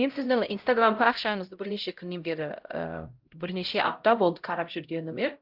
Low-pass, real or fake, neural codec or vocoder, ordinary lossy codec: 5.4 kHz; fake; codec, 16 kHz, 0.5 kbps, X-Codec, HuBERT features, trained on LibriSpeech; Opus, 32 kbps